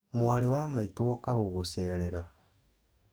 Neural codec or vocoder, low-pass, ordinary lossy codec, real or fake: codec, 44.1 kHz, 2.6 kbps, DAC; none; none; fake